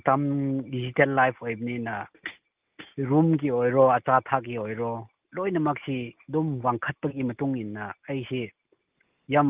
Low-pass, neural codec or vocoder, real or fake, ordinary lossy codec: 3.6 kHz; none; real; Opus, 24 kbps